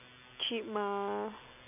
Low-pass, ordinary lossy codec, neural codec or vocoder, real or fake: 3.6 kHz; none; none; real